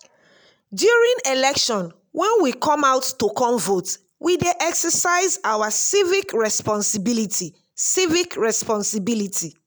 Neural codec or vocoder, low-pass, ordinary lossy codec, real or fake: none; none; none; real